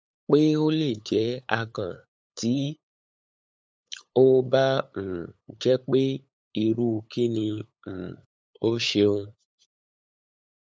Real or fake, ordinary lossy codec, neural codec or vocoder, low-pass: fake; none; codec, 16 kHz, 8 kbps, FunCodec, trained on LibriTTS, 25 frames a second; none